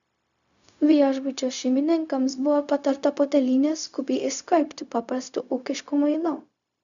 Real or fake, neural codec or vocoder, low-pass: fake; codec, 16 kHz, 0.4 kbps, LongCat-Audio-Codec; 7.2 kHz